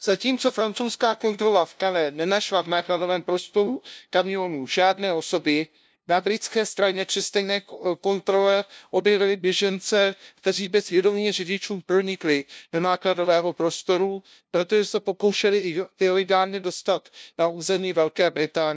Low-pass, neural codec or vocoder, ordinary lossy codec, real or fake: none; codec, 16 kHz, 0.5 kbps, FunCodec, trained on LibriTTS, 25 frames a second; none; fake